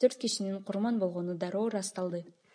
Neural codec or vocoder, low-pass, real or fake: none; 9.9 kHz; real